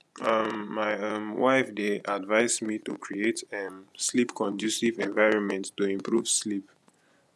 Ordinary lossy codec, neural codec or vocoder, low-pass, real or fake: none; vocoder, 24 kHz, 100 mel bands, Vocos; none; fake